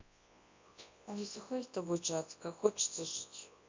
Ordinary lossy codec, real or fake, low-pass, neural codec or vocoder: MP3, 64 kbps; fake; 7.2 kHz; codec, 24 kHz, 0.9 kbps, WavTokenizer, large speech release